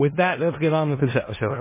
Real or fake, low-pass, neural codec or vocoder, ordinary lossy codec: fake; 3.6 kHz; codec, 16 kHz in and 24 kHz out, 0.4 kbps, LongCat-Audio-Codec, four codebook decoder; MP3, 16 kbps